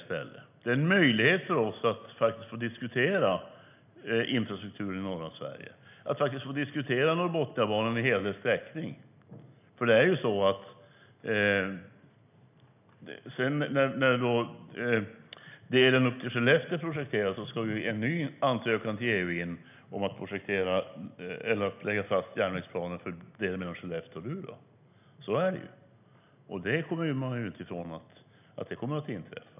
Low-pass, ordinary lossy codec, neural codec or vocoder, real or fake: 3.6 kHz; none; none; real